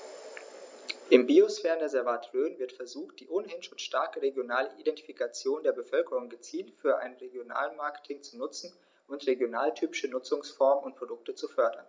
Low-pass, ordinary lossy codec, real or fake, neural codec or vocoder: none; none; real; none